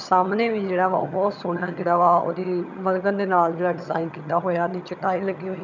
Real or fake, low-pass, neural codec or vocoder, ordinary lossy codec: fake; 7.2 kHz; vocoder, 22.05 kHz, 80 mel bands, HiFi-GAN; none